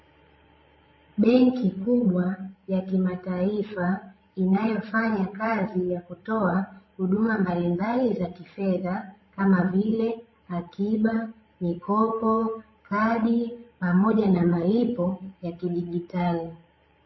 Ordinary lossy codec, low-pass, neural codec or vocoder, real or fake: MP3, 24 kbps; 7.2 kHz; codec, 16 kHz, 16 kbps, FreqCodec, larger model; fake